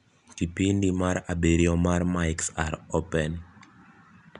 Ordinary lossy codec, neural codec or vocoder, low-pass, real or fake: none; none; 10.8 kHz; real